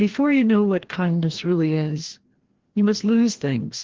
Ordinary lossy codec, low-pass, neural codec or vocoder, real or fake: Opus, 16 kbps; 7.2 kHz; codec, 16 kHz, 1 kbps, FreqCodec, larger model; fake